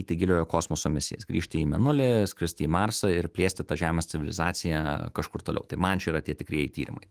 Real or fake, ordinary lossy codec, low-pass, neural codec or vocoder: fake; Opus, 24 kbps; 14.4 kHz; autoencoder, 48 kHz, 128 numbers a frame, DAC-VAE, trained on Japanese speech